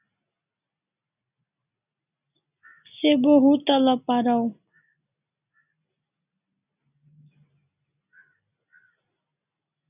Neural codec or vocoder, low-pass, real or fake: none; 3.6 kHz; real